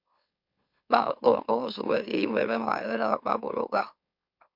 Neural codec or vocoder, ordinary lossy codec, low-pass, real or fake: autoencoder, 44.1 kHz, a latent of 192 numbers a frame, MeloTTS; MP3, 48 kbps; 5.4 kHz; fake